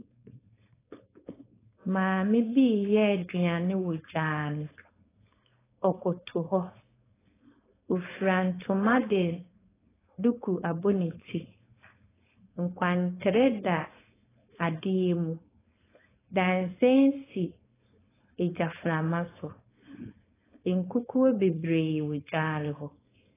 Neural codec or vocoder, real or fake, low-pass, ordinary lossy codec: codec, 16 kHz, 4.8 kbps, FACodec; fake; 3.6 kHz; AAC, 16 kbps